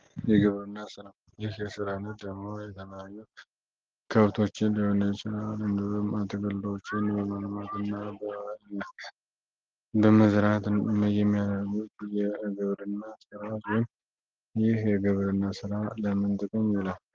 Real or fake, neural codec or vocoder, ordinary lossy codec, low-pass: real; none; Opus, 16 kbps; 7.2 kHz